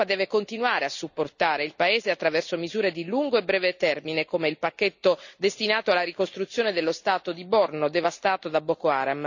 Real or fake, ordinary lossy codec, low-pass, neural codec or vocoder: real; none; 7.2 kHz; none